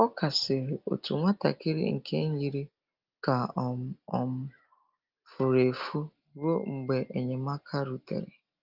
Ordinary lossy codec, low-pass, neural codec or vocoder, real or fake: Opus, 24 kbps; 5.4 kHz; none; real